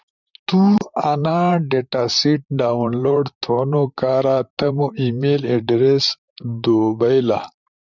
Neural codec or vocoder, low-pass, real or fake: vocoder, 44.1 kHz, 128 mel bands, Pupu-Vocoder; 7.2 kHz; fake